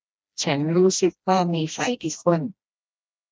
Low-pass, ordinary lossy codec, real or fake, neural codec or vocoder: none; none; fake; codec, 16 kHz, 1 kbps, FreqCodec, smaller model